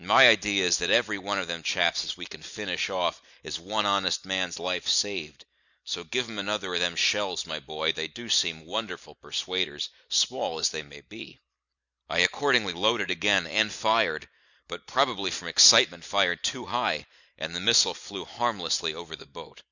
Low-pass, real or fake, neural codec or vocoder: 7.2 kHz; real; none